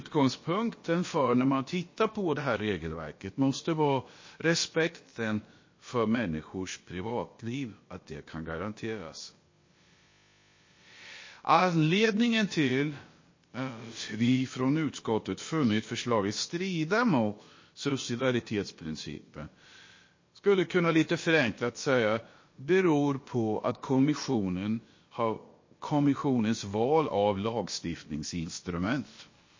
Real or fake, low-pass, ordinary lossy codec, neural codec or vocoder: fake; 7.2 kHz; MP3, 32 kbps; codec, 16 kHz, about 1 kbps, DyCAST, with the encoder's durations